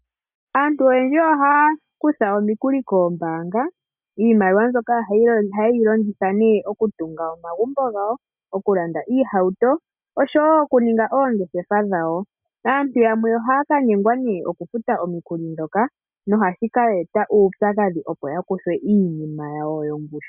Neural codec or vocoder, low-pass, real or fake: none; 3.6 kHz; real